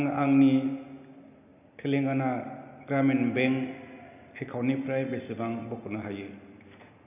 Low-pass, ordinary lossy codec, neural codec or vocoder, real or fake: 3.6 kHz; none; none; real